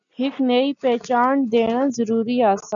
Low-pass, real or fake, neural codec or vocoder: 7.2 kHz; real; none